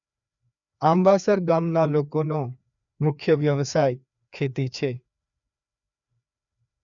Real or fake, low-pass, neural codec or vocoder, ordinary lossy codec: fake; 7.2 kHz; codec, 16 kHz, 2 kbps, FreqCodec, larger model; none